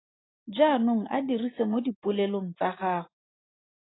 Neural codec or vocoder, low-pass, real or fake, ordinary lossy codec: none; 7.2 kHz; real; AAC, 16 kbps